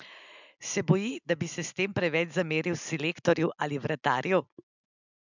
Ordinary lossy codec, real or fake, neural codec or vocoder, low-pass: none; real; none; 7.2 kHz